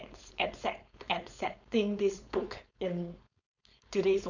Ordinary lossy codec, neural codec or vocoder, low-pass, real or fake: none; codec, 16 kHz, 4.8 kbps, FACodec; 7.2 kHz; fake